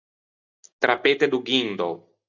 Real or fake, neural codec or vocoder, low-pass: real; none; 7.2 kHz